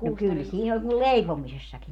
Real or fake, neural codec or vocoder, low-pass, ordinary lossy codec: real; none; 19.8 kHz; none